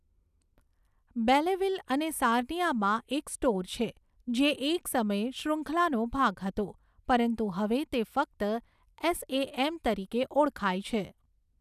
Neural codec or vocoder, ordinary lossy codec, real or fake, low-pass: none; none; real; 14.4 kHz